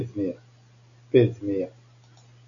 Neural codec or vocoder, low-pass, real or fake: none; 7.2 kHz; real